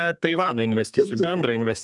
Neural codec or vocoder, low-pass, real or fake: codec, 32 kHz, 1.9 kbps, SNAC; 10.8 kHz; fake